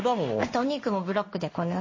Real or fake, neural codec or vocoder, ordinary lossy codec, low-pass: fake; codec, 16 kHz in and 24 kHz out, 1 kbps, XY-Tokenizer; MP3, 32 kbps; 7.2 kHz